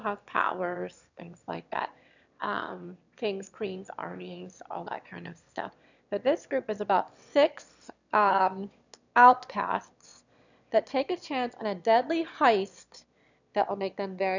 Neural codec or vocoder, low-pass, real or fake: autoencoder, 22.05 kHz, a latent of 192 numbers a frame, VITS, trained on one speaker; 7.2 kHz; fake